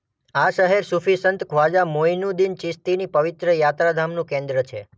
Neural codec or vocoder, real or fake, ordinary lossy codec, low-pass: none; real; none; none